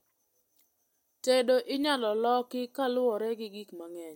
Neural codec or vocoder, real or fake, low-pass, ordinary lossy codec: none; real; 19.8 kHz; MP3, 64 kbps